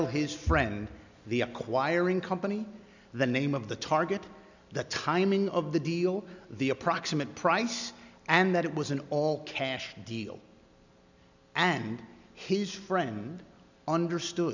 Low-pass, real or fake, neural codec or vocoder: 7.2 kHz; real; none